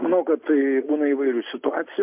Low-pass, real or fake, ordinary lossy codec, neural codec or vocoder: 3.6 kHz; real; MP3, 32 kbps; none